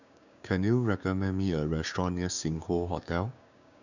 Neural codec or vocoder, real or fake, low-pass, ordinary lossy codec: codec, 16 kHz, 6 kbps, DAC; fake; 7.2 kHz; none